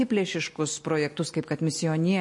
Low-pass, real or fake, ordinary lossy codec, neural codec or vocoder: 10.8 kHz; real; MP3, 48 kbps; none